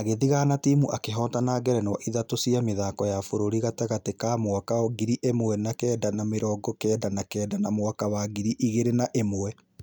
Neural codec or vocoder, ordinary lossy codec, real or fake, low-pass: none; none; real; none